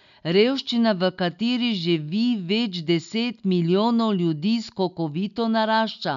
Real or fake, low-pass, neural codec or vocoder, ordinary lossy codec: real; 7.2 kHz; none; none